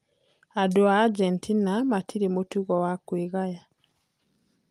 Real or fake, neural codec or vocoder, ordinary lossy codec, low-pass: real; none; Opus, 32 kbps; 14.4 kHz